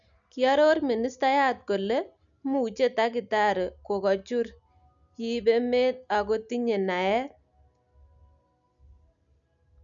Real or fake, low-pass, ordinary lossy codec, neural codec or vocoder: real; 7.2 kHz; none; none